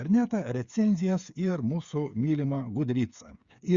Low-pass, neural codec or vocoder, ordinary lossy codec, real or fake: 7.2 kHz; codec, 16 kHz, 8 kbps, FreqCodec, smaller model; Opus, 64 kbps; fake